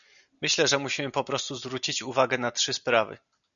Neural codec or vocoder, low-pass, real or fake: none; 7.2 kHz; real